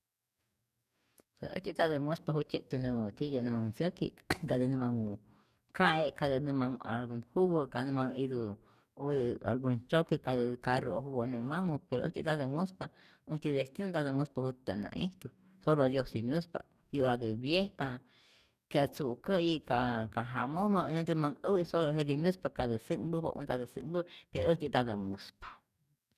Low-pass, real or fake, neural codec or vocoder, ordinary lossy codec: 14.4 kHz; fake; codec, 44.1 kHz, 2.6 kbps, DAC; none